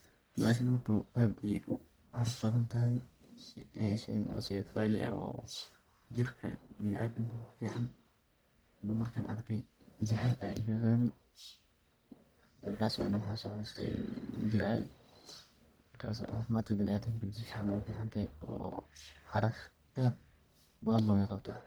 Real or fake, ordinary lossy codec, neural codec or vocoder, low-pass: fake; none; codec, 44.1 kHz, 1.7 kbps, Pupu-Codec; none